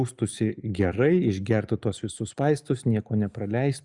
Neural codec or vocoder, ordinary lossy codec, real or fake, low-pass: none; Opus, 64 kbps; real; 10.8 kHz